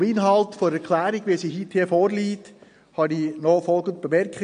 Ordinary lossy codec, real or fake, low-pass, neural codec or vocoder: MP3, 48 kbps; real; 9.9 kHz; none